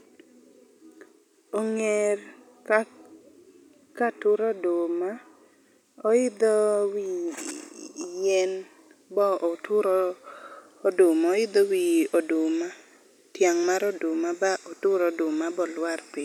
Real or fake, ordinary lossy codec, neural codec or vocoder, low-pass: real; none; none; 19.8 kHz